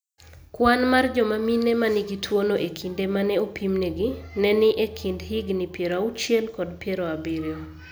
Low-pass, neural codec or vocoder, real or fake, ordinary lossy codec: none; none; real; none